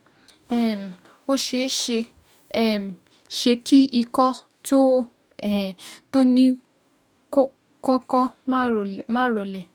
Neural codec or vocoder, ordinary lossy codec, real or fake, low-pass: codec, 44.1 kHz, 2.6 kbps, DAC; none; fake; 19.8 kHz